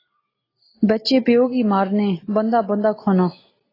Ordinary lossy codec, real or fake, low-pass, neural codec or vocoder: AAC, 24 kbps; real; 5.4 kHz; none